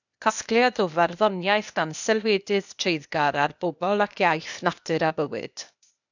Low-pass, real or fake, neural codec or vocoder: 7.2 kHz; fake; codec, 16 kHz, 0.8 kbps, ZipCodec